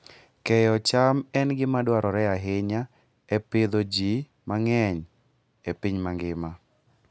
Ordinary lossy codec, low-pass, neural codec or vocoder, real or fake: none; none; none; real